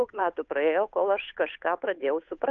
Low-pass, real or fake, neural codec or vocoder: 7.2 kHz; fake; codec, 16 kHz, 8 kbps, FunCodec, trained on Chinese and English, 25 frames a second